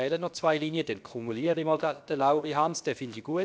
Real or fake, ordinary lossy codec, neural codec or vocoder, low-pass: fake; none; codec, 16 kHz, about 1 kbps, DyCAST, with the encoder's durations; none